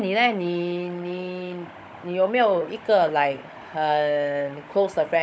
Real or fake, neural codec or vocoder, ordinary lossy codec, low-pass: fake; codec, 16 kHz, 16 kbps, FunCodec, trained on Chinese and English, 50 frames a second; none; none